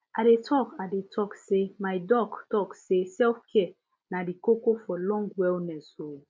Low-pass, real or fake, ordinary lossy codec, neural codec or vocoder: none; real; none; none